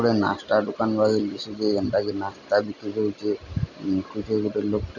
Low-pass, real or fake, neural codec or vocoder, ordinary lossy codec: 7.2 kHz; real; none; none